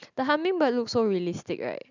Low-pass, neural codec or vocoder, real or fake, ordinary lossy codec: 7.2 kHz; none; real; none